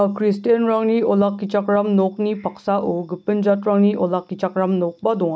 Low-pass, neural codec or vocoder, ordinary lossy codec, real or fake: none; none; none; real